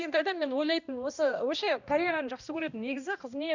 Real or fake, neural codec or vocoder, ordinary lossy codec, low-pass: fake; codec, 16 kHz, 1 kbps, X-Codec, HuBERT features, trained on balanced general audio; none; 7.2 kHz